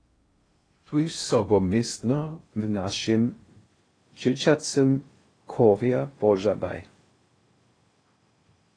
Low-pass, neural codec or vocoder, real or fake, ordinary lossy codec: 9.9 kHz; codec, 16 kHz in and 24 kHz out, 0.6 kbps, FocalCodec, streaming, 2048 codes; fake; AAC, 32 kbps